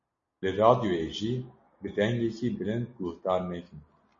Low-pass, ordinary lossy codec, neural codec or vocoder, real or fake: 7.2 kHz; MP3, 32 kbps; none; real